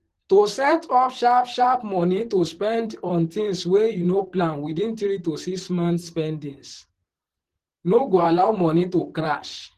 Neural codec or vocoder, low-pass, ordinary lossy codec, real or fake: vocoder, 44.1 kHz, 128 mel bands, Pupu-Vocoder; 14.4 kHz; Opus, 16 kbps; fake